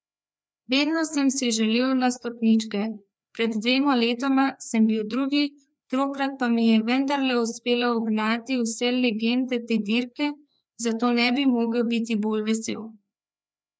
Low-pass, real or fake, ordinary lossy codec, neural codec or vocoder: none; fake; none; codec, 16 kHz, 2 kbps, FreqCodec, larger model